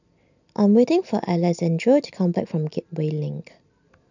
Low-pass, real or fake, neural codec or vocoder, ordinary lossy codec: 7.2 kHz; real; none; none